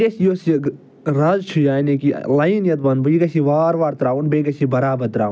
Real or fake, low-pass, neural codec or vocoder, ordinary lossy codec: real; none; none; none